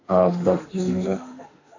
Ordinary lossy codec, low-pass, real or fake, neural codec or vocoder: AAC, 48 kbps; 7.2 kHz; fake; autoencoder, 48 kHz, 32 numbers a frame, DAC-VAE, trained on Japanese speech